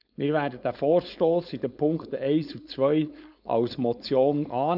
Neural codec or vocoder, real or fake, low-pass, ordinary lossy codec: codec, 16 kHz, 4.8 kbps, FACodec; fake; 5.4 kHz; none